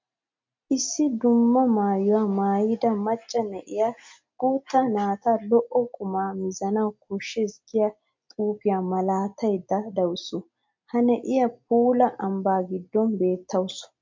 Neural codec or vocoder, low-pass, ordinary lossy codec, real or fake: none; 7.2 kHz; MP3, 48 kbps; real